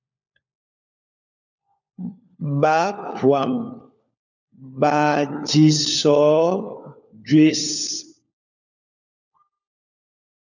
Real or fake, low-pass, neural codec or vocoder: fake; 7.2 kHz; codec, 16 kHz, 4 kbps, FunCodec, trained on LibriTTS, 50 frames a second